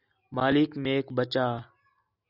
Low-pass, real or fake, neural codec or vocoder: 5.4 kHz; real; none